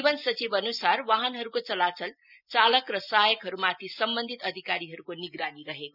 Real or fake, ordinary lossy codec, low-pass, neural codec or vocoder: real; none; 5.4 kHz; none